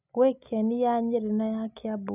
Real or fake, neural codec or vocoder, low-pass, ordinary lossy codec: real; none; 3.6 kHz; none